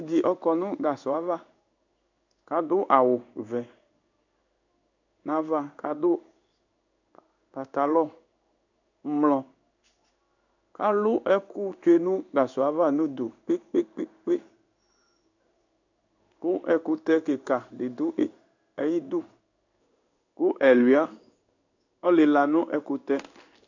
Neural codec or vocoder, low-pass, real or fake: codec, 16 kHz in and 24 kHz out, 1 kbps, XY-Tokenizer; 7.2 kHz; fake